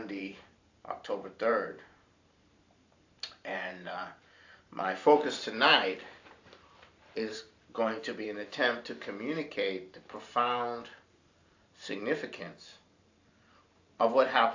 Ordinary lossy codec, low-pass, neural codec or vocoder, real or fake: AAC, 48 kbps; 7.2 kHz; vocoder, 44.1 kHz, 128 mel bands every 512 samples, BigVGAN v2; fake